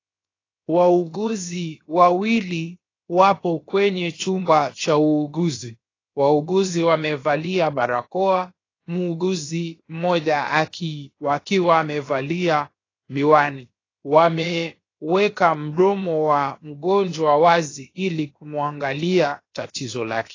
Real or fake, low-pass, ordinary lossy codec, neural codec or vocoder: fake; 7.2 kHz; AAC, 32 kbps; codec, 16 kHz, 0.7 kbps, FocalCodec